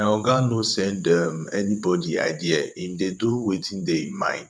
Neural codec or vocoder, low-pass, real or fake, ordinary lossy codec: vocoder, 22.05 kHz, 80 mel bands, WaveNeXt; none; fake; none